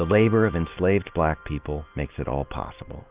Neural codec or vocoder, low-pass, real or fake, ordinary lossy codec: none; 3.6 kHz; real; Opus, 32 kbps